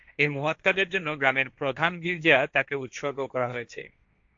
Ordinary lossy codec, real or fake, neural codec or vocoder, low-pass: AAC, 64 kbps; fake; codec, 16 kHz, 1.1 kbps, Voila-Tokenizer; 7.2 kHz